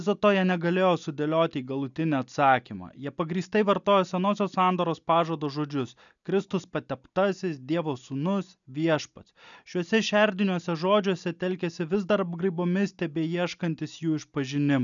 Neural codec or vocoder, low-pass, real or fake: none; 7.2 kHz; real